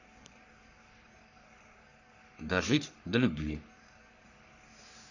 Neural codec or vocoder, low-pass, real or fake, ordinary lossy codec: codec, 44.1 kHz, 3.4 kbps, Pupu-Codec; 7.2 kHz; fake; none